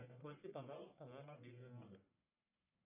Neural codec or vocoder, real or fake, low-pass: codec, 44.1 kHz, 1.7 kbps, Pupu-Codec; fake; 3.6 kHz